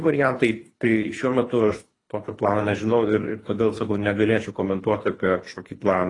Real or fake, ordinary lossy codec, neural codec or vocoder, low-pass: fake; AAC, 32 kbps; codec, 24 kHz, 3 kbps, HILCodec; 10.8 kHz